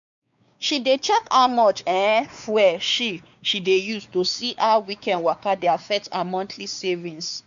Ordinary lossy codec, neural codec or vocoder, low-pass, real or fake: none; codec, 16 kHz, 4 kbps, X-Codec, WavLM features, trained on Multilingual LibriSpeech; 7.2 kHz; fake